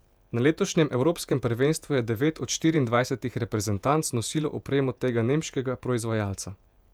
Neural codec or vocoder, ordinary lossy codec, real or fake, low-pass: vocoder, 48 kHz, 128 mel bands, Vocos; none; fake; 19.8 kHz